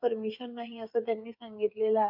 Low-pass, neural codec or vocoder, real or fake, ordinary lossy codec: 5.4 kHz; codec, 16 kHz, 8 kbps, FreqCodec, smaller model; fake; MP3, 48 kbps